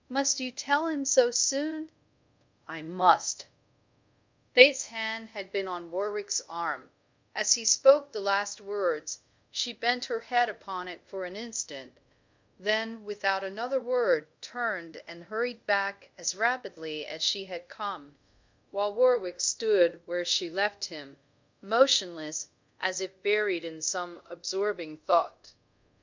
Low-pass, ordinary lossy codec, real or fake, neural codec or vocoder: 7.2 kHz; MP3, 64 kbps; fake; codec, 24 kHz, 0.5 kbps, DualCodec